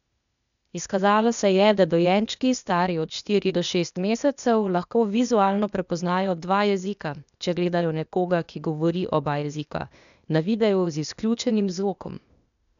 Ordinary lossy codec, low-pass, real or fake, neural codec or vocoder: none; 7.2 kHz; fake; codec, 16 kHz, 0.8 kbps, ZipCodec